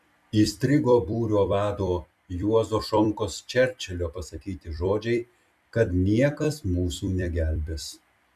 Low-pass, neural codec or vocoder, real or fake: 14.4 kHz; vocoder, 44.1 kHz, 128 mel bands every 256 samples, BigVGAN v2; fake